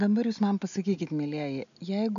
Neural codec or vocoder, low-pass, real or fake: none; 7.2 kHz; real